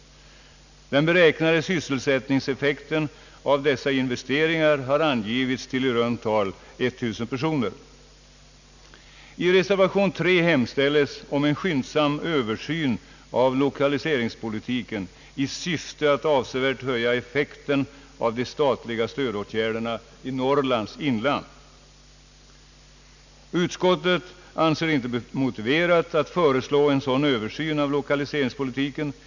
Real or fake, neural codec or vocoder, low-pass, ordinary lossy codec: real; none; 7.2 kHz; none